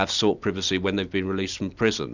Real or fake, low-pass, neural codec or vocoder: real; 7.2 kHz; none